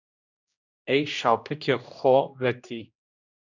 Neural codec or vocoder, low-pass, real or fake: codec, 16 kHz, 1 kbps, X-Codec, HuBERT features, trained on general audio; 7.2 kHz; fake